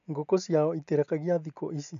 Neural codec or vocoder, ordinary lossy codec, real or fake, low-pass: none; none; real; 7.2 kHz